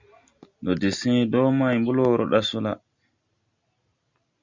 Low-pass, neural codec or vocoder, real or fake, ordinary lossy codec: 7.2 kHz; none; real; Opus, 64 kbps